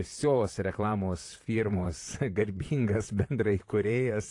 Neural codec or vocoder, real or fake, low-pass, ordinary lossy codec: vocoder, 44.1 kHz, 128 mel bands, Pupu-Vocoder; fake; 10.8 kHz; AAC, 48 kbps